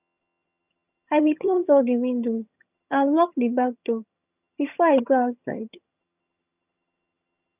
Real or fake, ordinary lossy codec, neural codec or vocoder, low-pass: fake; none; vocoder, 22.05 kHz, 80 mel bands, HiFi-GAN; 3.6 kHz